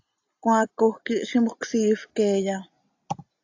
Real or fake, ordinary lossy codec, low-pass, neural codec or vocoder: real; AAC, 48 kbps; 7.2 kHz; none